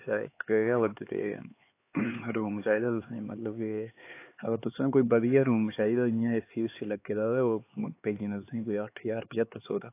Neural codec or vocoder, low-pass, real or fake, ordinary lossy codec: codec, 16 kHz, 4 kbps, X-Codec, HuBERT features, trained on LibriSpeech; 3.6 kHz; fake; AAC, 24 kbps